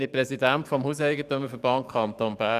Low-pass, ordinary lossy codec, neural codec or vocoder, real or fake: 14.4 kHz; none; codec, 44.1 kHz, 7.8 kbps, DAC; fake